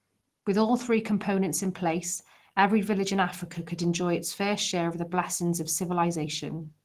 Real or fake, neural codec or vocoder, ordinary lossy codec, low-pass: real; none; Opus, 16 kbps; 14.4 kHz